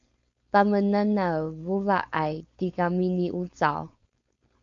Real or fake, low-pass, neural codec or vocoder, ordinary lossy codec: fake; 7.2 kHz; codec, 16 kHz, 4.8 kbps, FACodec; MP3, 48 kbps